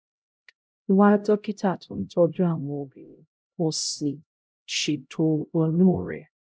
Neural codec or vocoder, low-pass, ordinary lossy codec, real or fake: codec, 16 kHz, 0.5 kbps, X-Codec, HuBERT features, trained on LibriSpeech; none; none; fake